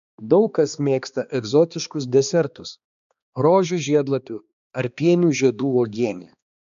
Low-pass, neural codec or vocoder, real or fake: 7.2 kHz; codec, 16 kHz, 2 kbps, X-Codec, HuBERT features, trained on balanced general audio; fake